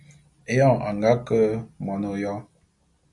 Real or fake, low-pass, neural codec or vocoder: real; 10.8 kHz; none